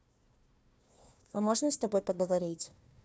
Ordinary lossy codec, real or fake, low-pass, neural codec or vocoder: none; fake; none; codec, 16 kHz, 1 kbps, FunCodec, trained on Chinese and English, 50 frames a second